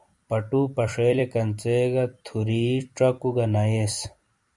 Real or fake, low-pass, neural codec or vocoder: fake; 10.8 kHz; vocoder, 44.1 kHz, 128 mel bands every 256 samples, BigVGAN v2